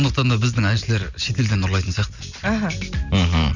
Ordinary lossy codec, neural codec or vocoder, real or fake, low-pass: none; none; real; 7.2 kHz